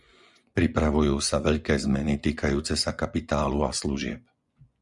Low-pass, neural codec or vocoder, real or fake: 10.8 kHz; vocoder, 24 kHz, 100 mel bands, Vocos; fake